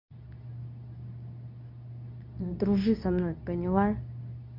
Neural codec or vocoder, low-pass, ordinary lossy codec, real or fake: codec, 24 kHz, 0.9 kbps, WavTokenizer, medium speech release version 2; 5.4 kHz; none; fake